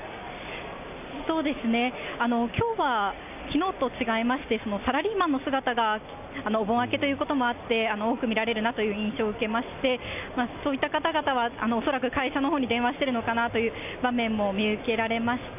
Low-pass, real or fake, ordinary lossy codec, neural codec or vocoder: 3.6 kHz; real; none; none